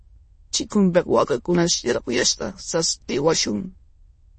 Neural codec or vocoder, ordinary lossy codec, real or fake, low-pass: autoencoder, 22.05 kHz, a latent of 192 numbers a frame, VITS, trained on many speakers; MP3, 32 kbps; fake; 9.9 kHz